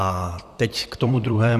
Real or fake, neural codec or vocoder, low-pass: fake; vocoder, 44.1 kHz, 128 mel bands, Pupu-Vocoder; 14.4 kHz